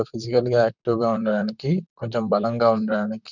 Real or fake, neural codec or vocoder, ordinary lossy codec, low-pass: fake; vocoder, 44.1 kHz, 128 mel bands, Pupu-Vocoder; none; 7.2 kHz